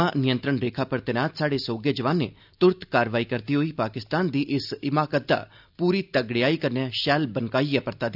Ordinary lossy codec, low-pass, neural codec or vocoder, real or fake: none; 5.4 kHz; none; real